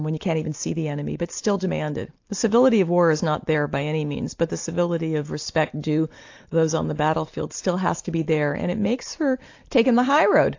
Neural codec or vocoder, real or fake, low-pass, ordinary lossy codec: none; real; 7.2 kHz; AAC, 48 kbps